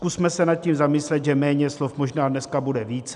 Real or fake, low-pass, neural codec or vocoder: real; 10.8 kHz; none